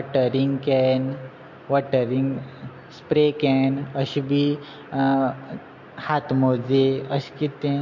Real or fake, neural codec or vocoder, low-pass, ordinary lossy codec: real; none; 7.2 kHz; MP3, 48 kbps